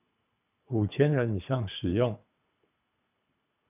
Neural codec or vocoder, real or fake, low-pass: codec, 24 kHz, 3 kbps, HILCodec; fake; 3.6 kHz